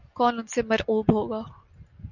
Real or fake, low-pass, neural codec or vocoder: real; 7.2 kHz; none